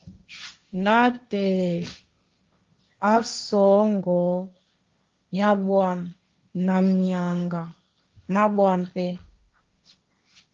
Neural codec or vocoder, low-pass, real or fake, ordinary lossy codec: codec, 16 kHz, 1.1 kbps, Voila-Tokenizer; 7.2 kHz; fake; Opus, 32 kbps